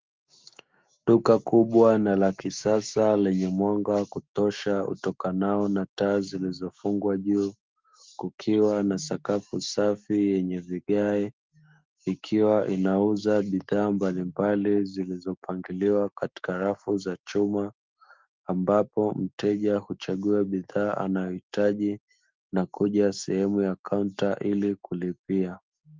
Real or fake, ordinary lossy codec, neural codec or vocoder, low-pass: fake; Opus, 32 kbps; autoencoder, 48 kHz, 128 numbers a frame, DAC-VAE, trained on Japanese speech; 7.2 kHz